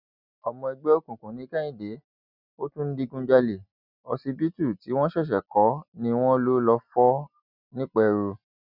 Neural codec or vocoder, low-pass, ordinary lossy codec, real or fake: none; 5.4 kHz; none; real